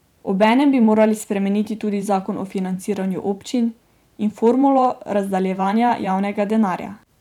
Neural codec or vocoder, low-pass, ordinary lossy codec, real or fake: vocoder, 44.1 kHz, 128 mel bands every 512 samples, BigVGAN v2; 19.8 kHz; none; fake